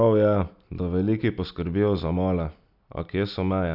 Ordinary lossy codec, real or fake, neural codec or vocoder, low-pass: none; real; none; 5.4 kHz